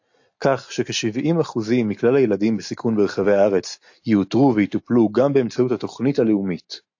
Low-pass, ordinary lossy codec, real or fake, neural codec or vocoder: 7.2 kHz; AAC, 48 kbps; real; none